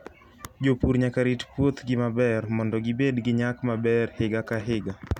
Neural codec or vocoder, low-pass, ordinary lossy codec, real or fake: none; 19.8 kHz; none; real